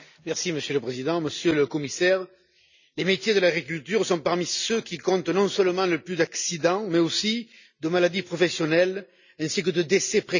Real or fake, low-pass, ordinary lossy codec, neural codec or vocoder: real; 7.2 kHz; none; none